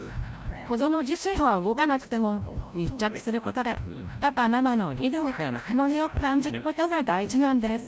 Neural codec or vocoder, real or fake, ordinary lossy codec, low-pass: codec, 16 kHz, 0.5 kbps, FreqCodec, larger model; fake; none; none